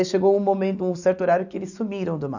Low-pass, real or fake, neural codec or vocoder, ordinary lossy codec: 7.2 kHz; real; none; none